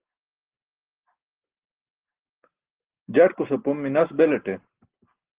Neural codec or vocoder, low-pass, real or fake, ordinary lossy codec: none; 3.6 kHz; real; Opus, 16 kbps